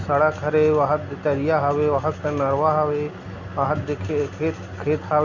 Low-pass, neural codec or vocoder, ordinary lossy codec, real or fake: 7.2 kHz; none; none; real